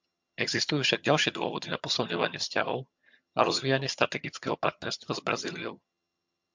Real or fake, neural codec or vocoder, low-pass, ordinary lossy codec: fake; vocoder, 22.05 kHz, 80 mel bands, HiFi-GAN; 7.2 kHz; MP3, 64 kbps